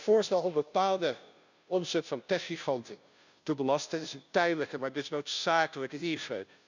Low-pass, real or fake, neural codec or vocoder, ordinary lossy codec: 7.2 kHz; fake; codec, 16 kHz, 0.5 kbps, FunCodec, trained on Chinese and English, 25 frames a second; none